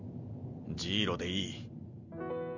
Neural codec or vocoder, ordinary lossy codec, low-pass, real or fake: none; none; 7.2 kHz; real